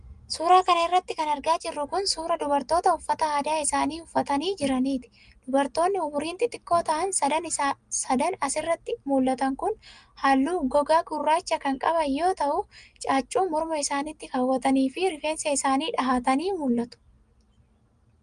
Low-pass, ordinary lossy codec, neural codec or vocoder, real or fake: 9.9 kHz; Opus, 32 kbps; none; real